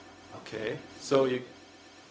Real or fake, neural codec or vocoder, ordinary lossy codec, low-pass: fake; codec, 16 kHz, 0.4 kbps, LongCat-Audio-Codec; none; none